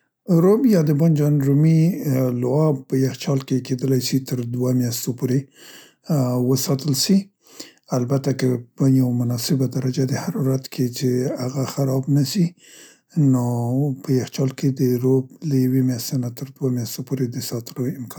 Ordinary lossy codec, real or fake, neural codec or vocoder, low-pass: none; real; none; none